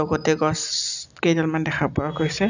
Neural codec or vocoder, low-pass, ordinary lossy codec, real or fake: none; 7.2 kHz; none; real